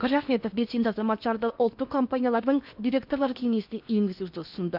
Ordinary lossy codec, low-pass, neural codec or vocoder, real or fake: none; 5.4 kHz; codec, 16 kHz in and 24 kHz out, 0.8 kbps, FocalCodec, streaming, 65536 codes; fake